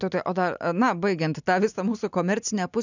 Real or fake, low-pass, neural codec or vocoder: real; 7.2 kHz; none